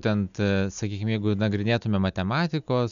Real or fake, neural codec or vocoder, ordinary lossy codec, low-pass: real; none; MP3, 96 kbps; 7.2 kHz